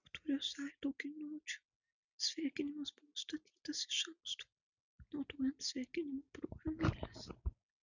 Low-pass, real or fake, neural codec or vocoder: 7.2 kHz; real; none